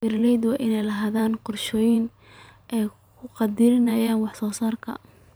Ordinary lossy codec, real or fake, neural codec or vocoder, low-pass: none; fake; vocoder, 44.1 kHz, 128 mel bands every 512 samples, BigVGAN v2; none